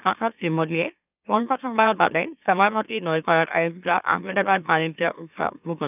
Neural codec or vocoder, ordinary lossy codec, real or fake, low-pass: autoencoder, 44.1 kHz, a latent of 192 numbers a frame, MeloTTS; none; fake; 3.6 kHz